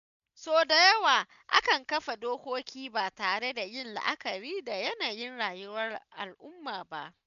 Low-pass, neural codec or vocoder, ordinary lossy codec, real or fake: 7.2 kHz; none; none; real